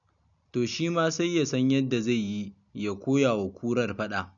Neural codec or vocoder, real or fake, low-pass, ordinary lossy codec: none; real; 7.2 kHz; none